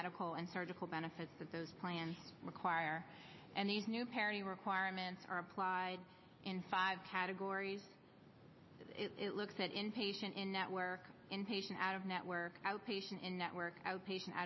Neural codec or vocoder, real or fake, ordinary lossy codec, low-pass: none; real; MP3, 24 kbps; 7.2 kHz